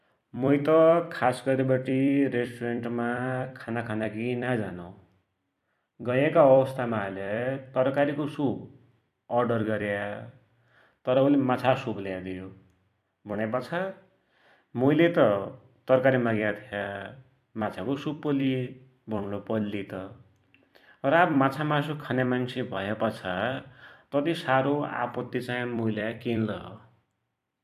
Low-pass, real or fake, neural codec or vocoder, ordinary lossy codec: 14.4 kHz; fake; vocoder, 44.1 kHz, 128 mel bands every 256 samples, BigVGAN v2; AAC, 96 kbps